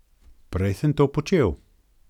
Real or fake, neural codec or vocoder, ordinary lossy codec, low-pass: real; none; none; 19.8 kHz